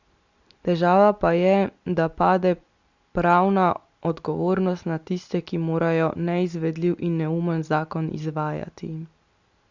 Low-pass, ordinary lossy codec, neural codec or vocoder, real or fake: 7.2 kHz; Opus, 64 kbps; none; real